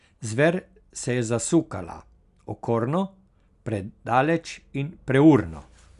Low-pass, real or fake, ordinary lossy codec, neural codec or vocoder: 10.8 kHz; real; none; none